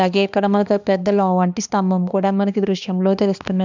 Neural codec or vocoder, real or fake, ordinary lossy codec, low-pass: codec, 16 kHz, 2 kbps, X-Codec, HuBERT features, trained on balanced general audio; fake; none; 7.2 kHz